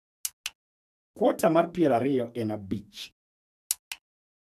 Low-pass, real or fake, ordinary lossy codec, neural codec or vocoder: 14.4 kHz; fake; none; codec, 44.1 kHz, 2.6 kbps, SNAC